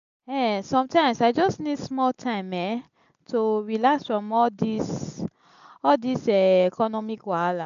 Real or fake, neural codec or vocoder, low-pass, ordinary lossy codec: real; none; 7.2 kHz; MP3, 96 kbps